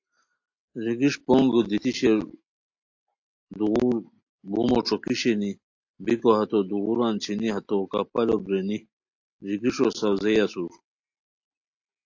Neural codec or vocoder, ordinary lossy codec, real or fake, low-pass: none; AAC, 48 kbps; real; 7.2 kHz